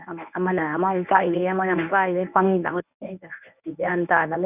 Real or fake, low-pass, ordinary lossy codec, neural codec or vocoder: fake; 3.6 kHz; none; codec, 24 kHz, 0.9 kbps, WavTokenizer, medium speech release version 1